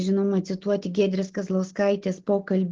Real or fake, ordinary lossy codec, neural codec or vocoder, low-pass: real; Opus, 16 kbps; none; 7.2 kHz